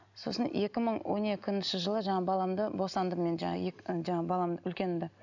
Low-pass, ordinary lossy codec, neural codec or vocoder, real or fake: 7.2 kHz; none; none; real